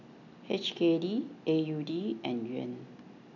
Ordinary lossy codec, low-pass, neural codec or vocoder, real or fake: none; 7.2 kHz; none; real